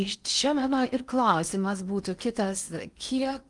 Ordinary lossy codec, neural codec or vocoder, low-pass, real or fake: Opus, 32 kbps; codec, 16 kHz in and 24 kHz out, 0.6 kbps, FocalCodec, streaming, 4096 codes; 10.8 kHz; fake